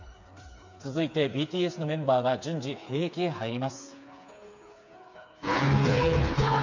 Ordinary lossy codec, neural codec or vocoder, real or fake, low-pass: MP3, 64 kbps; codec, 16 kHz, 4 kbps, FreqCodec, smaller model; fake; 7.2 kHz